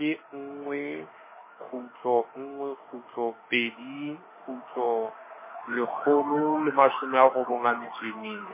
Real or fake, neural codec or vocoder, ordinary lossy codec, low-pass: fake; autoencoder, 48 kHz, 32 numbers a frame, DAC-VAE, trained on Japanese speech; MP3, 16 kbps; 3.6 kHz